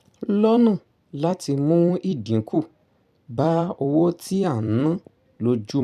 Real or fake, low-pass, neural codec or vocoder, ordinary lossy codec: fake; 14.4 kHz; vocoder, 44.1 kHz, 128 mel bands every 256 samples, BigVGAN v2; none